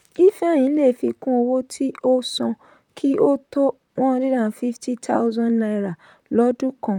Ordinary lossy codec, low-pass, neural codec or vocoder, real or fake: none; 19.8 kHz; vocoder, 44.1 kHz, 128 mel bands, Pupu-Vocoder; fake